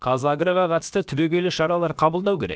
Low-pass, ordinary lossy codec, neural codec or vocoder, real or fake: none; none; codec, 16 kHz, about 1 kbps, DyCAST, with the encoder's durations; fake